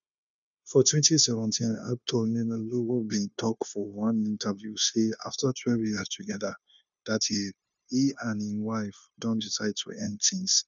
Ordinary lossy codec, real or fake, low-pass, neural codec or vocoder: none; fake; 7.2 kHz; codec, 16 kHz, 0.9 kbps, LongCat-Audio-Codec